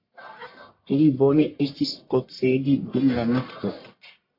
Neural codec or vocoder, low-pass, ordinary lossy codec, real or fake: codec, 44.1 kHz, 1.7 kbps, Pupu-Codec; 5.4 kHz; MP3, 32 kbps; fake